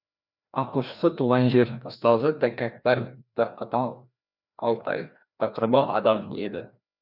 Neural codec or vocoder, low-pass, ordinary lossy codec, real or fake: codec, 16 kHz, 1 kbps, FreqCodec, larger model; 5.4 kHz; none; fake